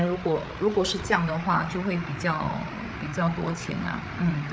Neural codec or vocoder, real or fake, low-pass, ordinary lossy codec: codec, 16 kHz, 8 kbps, FreqCodec, larger model; fake; none; none